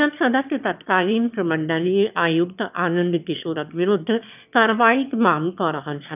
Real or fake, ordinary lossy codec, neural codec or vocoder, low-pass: fake; none; autoencoder, 22.05 kHz, a latent of 192 numbers a frame, VITS, trained on one speaker; 3.6 kHz